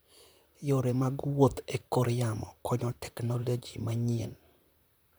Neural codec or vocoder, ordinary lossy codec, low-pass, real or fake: vocoder, 44.1 kHz, 128 mel bands, Pupu-Vocoder; none; none; fake